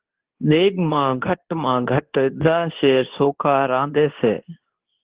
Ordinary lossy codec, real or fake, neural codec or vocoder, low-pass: Opus, 16 kbps; fake; codec, 24 kHz, 0.9 kbps, WavTokenizer, medium speech release version 2; 3.6 kHz